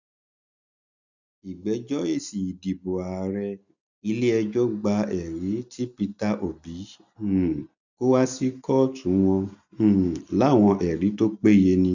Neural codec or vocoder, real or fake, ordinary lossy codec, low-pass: none; real; none; 7.2 kHz